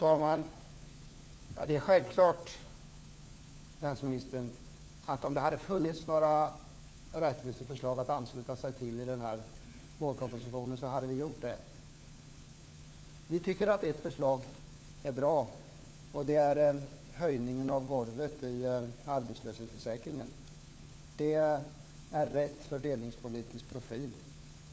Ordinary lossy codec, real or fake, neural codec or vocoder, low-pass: none; fake; codec, 16 kHz, 4 kbps, FunCodec, trained on LibriTTS, 50 frames a second; none